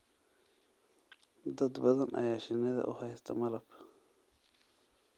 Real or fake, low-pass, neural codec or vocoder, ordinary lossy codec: real; 19.8 kHz; none; Opus, 32 kbps